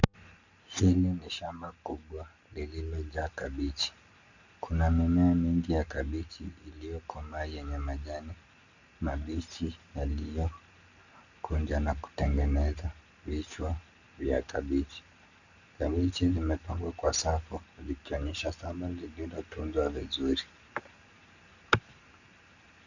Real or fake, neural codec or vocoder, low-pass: real; none; 7.2 kHz